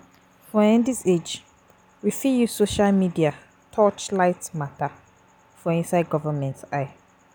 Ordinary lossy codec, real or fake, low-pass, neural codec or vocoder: none; real; none; none